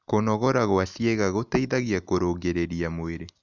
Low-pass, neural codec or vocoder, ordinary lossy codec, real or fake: 7.2 kHz; none; none; real